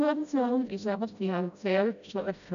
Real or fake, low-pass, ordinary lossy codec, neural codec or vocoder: fake; 7.2 kHz; MP3, 96 kbps; codec, 16 kHz, 0.5 kbps, FreqCodec, smaller model